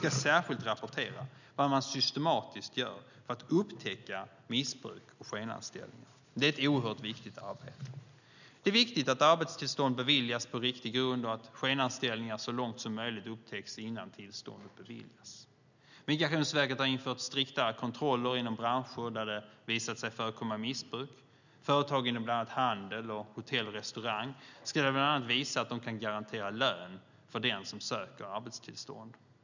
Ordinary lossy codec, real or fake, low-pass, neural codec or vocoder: none; real; 7.2 kHz; none